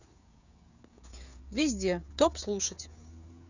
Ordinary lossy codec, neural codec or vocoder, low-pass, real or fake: none; codec, 16 kHz, 16 kbps, FreqCodec, smaller model; 7.2 kHz; fake